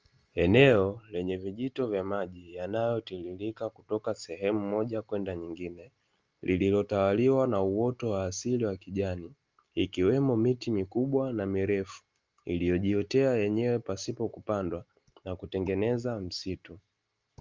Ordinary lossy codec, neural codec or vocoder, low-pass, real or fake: Opus, 24 kbps; none; 7.2 kHz; real